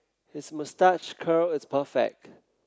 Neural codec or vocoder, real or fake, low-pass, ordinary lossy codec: none; real; none; none